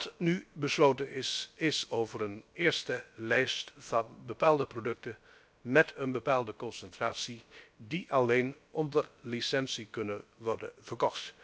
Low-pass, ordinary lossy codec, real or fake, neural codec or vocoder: none; none; fake; codec, 16 kHz, about 1 kbps, DyCAST, with the encoder's durations